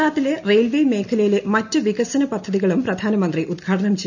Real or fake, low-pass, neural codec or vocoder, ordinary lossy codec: real; 7.2 kHz; none; none